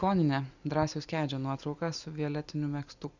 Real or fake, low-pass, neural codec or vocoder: real; 7.2 kHz; none